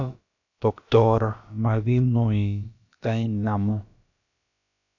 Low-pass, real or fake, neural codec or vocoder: 7.2 kHz; fake; codec, 16 kHz, about 1 kbps, DyCAST, with the encoder's durations